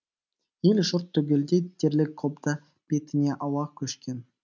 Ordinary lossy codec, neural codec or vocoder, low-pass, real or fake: none; none; 7.2 kHz; real